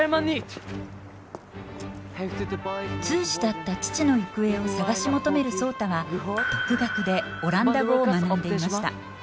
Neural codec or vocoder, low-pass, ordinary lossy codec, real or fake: none; none; none; real